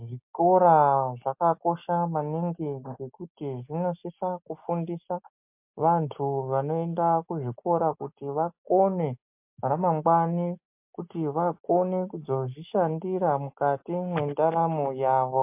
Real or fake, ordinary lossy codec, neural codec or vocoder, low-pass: real; MP3, 32 kbps; none; 3.6 kHz